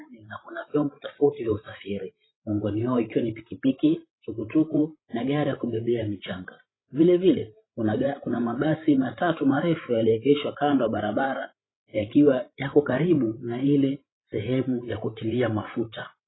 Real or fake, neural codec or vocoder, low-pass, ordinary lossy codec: fake; codec, 16 kHz, 16 kbps, FreqCodec, larger model; 7.2 kHz; AAC, 16 kbps